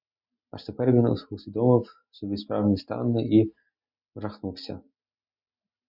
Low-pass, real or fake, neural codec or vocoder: 5.4 kHz; real; none